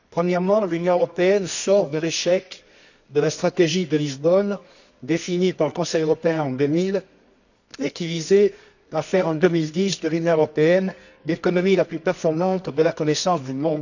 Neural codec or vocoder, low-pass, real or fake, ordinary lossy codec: codec, 24 kHz, 0.9 kbps, WavTokenizer, medium music audio release; 7.2 kHz; fake; none